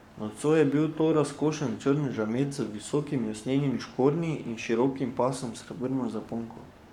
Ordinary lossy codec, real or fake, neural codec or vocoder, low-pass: none; fake; codec, 44.1 kHz, 7.8 kbps, Pupu-Codec; 19.8 kHz